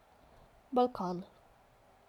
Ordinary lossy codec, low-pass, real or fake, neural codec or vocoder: none; 19.8 kHz; real; none